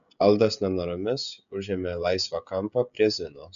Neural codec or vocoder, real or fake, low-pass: codec, 16 kHz, 16 kbps, FreqCodec, smaller model; fake; 7.2 kHz